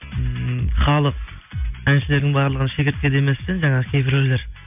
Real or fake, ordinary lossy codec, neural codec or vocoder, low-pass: real; none; none; 3.6 kHz